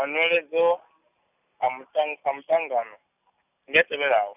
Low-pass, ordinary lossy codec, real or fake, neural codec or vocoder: 3.6 kHz; none; real; none